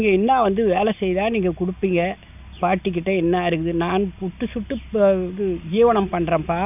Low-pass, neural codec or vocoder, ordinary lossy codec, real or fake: 3.6 kHz; none; none; real